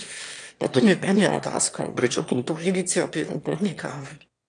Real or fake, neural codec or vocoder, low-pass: fake; autoencoder, 22.05 kHz, a latent of 192 numbers a frame, VITS, trained on one speaker; 9.9 kHz